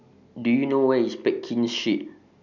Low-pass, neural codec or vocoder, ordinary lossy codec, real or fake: 7.2 kHz; none; none; real